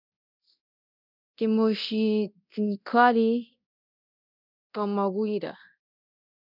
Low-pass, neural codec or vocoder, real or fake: 5.4 kHz; codec, 24 kHz, 0.9 kbps, DualCodec; fake